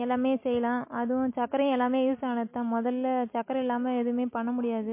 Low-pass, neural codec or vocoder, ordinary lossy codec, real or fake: 3.6 kHz; none; MP3, 24 kbps; real